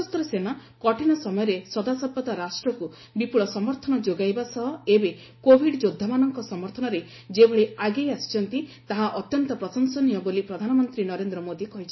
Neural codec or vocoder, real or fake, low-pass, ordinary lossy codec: none; real; 7.2 kHz; MP3, 24 kbps